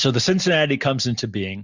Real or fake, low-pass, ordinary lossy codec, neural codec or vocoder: real; 7.2 kHz; Opus, 64 kbps; none